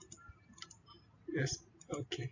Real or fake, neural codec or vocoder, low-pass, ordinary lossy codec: real; none; 7.2 kHz; AAC, 48 kbps